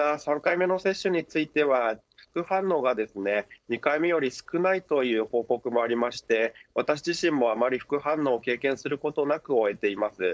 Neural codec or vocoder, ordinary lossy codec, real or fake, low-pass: codec, 16 kHz, 4.8 kbps, FACodec; none; fake; none